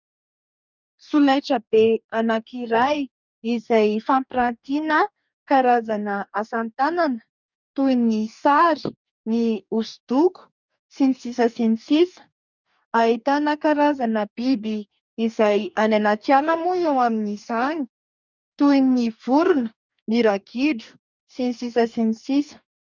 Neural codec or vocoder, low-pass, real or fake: codec, 44.1 kHz, 2.6 kbps, DAC; 7.2 kHz; fake